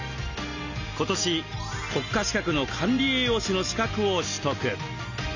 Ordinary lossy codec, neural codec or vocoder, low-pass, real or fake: none; none; 7.2 kHz; real